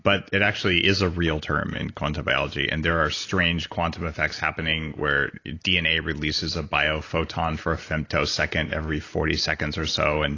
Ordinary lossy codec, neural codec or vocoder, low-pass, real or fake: AAC, 32 kbps; none; 7.2 kHz; real